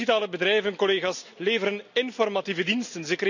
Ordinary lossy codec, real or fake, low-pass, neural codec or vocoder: none; real; 7.2 kHz; none